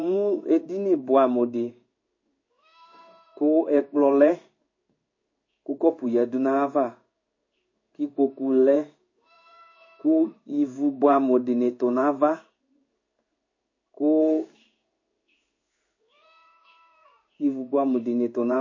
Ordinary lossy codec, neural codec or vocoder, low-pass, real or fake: MP3, 32 kbps; codec, 16 kHz in and 24 kHz out, 1 kbps, XY-Tokenizer; 7.2 kHz; fake